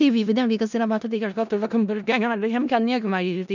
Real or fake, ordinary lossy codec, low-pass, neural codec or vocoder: fake; none; 7.2 kHz; codec, 16 kHz in and 24 kHz out, 0.4 kbps, LongCat-Audio-Codec, four codebook decoder